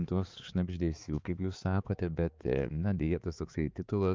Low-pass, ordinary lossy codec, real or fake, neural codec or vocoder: 7.2 kHz; Opus, 24 kbps; fake; codec, 16 kHz, 4 kbps, X-Codec, HuBERT features, trained on balanced general audio